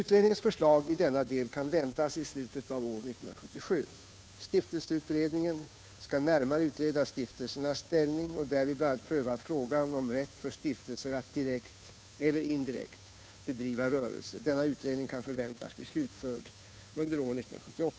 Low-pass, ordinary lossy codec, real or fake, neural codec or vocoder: none; none; fake; codec, 16 kHz, 2 kbps, FunCodec, trained on Chinese and English, 25 frames a second